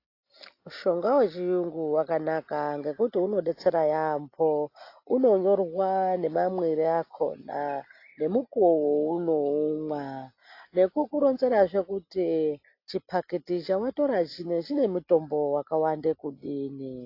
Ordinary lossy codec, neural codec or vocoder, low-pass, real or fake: AAC, 32 kbps; none; 5.4 kHz; real